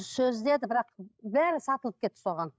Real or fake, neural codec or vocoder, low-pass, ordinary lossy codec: fake; codec, 16 kHz, 16 kbps, FreqCodec, larger model; none; none